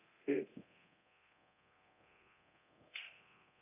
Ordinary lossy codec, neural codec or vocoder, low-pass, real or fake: AAC, 24 kbps; codec, 24 kHz, 0.9 kbps, DualCodec; 3.6 kHz; fake